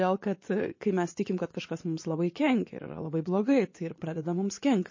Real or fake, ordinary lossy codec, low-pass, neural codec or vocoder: fake; MP3, 32 kbps; 7.2 kHz; vocoder, 24 kHz, 100 mel bands, Vocos